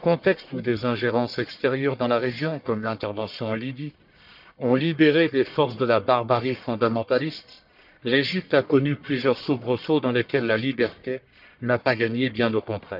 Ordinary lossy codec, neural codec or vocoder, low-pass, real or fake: none; codec, 44.1 kHz, 1.7 kbps, Pupu-Codec; 5.4 kHz; fake